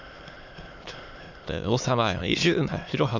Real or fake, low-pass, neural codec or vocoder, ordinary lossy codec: fake; 7.2 kHz; autoencoder, 22.05 kHz, a latent of 192 numbers a frame, VITS, trained on many speakers; MP3, 64 kbps